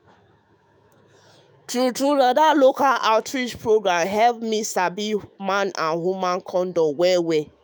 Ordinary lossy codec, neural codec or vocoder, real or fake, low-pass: none; autoencoder, 48 kHz, 128 numbers a frame, DAC-VAE, trained on Japanese speech; fake; none